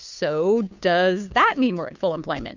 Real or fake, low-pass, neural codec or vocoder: fake; 7.2 kHz; codec, 16 kHz, 2 kbps, FunCodec, trained on Chinese and English, 25 frames a second